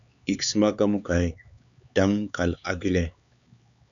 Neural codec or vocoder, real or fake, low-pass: codec, 16 kHz, 4 kbps, X-Codec, HuBERT features, trained on LibriSpeech; fake; 7.2 kHz